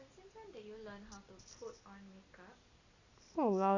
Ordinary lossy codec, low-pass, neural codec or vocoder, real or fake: Opus, 64 kbps; 7.2 kHz; none; real